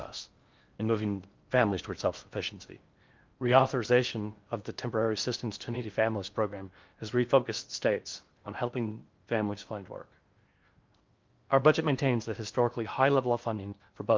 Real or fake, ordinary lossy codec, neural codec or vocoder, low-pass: fake; Opus, 24 kbps; codec, 16 kHz in and 24 kHz out, 0.6 kbps, FocalCodec, streaming, 4096 codes; 7.2 kHz